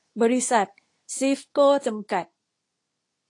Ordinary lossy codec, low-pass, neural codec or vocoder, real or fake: AAC, 48 kbps; 10.8 kHz; codec, 24 kHz, 0.9 kbps, WavTokenizer, medium speech release version 2; fake